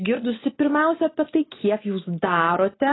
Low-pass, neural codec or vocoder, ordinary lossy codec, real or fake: 7.2 kHz; none; AAC, 16 kbps; real